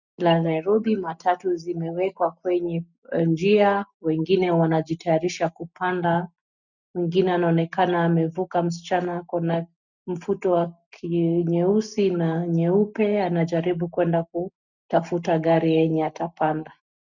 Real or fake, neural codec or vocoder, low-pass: real; none; 7.2 kHz